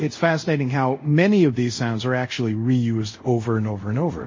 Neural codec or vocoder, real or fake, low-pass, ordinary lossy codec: codec, 24 kHz, 0.5 kbps, DualCodec; fake; 7.2 kHz; MP3, 32 kbps